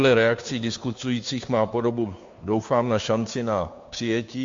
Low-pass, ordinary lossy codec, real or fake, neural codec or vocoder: 7.2 kHz; MP3, 48 kbps; fake; codec, 16 kHz, 2 kbps, FunCodec, trained on Chinese and English, 25 frames a second